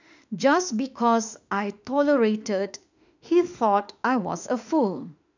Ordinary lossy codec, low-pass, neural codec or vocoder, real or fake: none; 7.2 kHz; autoencoder, 48 kHz, 32 numbers a frame, DAC-VAE, trained on Japanese speech; fake